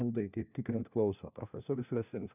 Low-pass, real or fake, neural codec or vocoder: 3.6 kHz; fake; codec, 16 kHz, 1 kbps, FunCodec, trained on LibriTTS, 50 frames a second